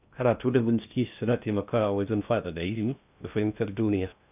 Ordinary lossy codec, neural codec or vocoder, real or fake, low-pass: none; codec, 16 kHz in and 24 kHz out, 0.6 kbps, FocalCodec, streaming, 2048 codes; fake; 3.6 kHz